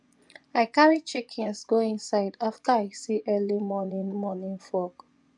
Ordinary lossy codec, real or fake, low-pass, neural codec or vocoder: none; fake; 10.8 kHz; vocoder, 24 kHz, 100 mel bands, Vocos